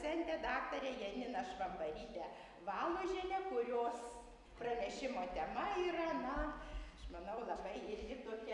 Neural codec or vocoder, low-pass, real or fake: none; 10.8 kHz; real